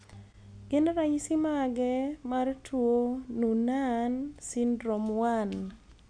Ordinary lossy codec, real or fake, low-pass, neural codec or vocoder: none; real; 9.9 kHz; none